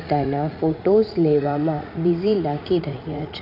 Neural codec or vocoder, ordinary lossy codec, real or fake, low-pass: vocoder, 44.1 kHz, 80 mel bands, Vocos; none; fake; 5.4 kHz